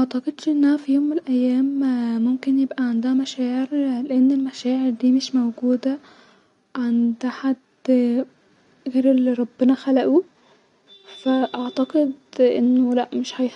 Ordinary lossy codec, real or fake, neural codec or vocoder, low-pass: none; real; none; 10.8 kHz